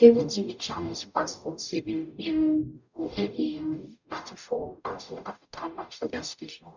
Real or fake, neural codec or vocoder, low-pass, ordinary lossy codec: fake; codec, 44.1 kHz, 0.9 kbps, DAC; 7.2 kHz; none